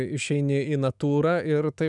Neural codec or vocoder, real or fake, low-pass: autoencoder, 48 kHz, 128 numbers a frame, DAC-VAE, trained on Japanese speech; fake; 10.8 kHz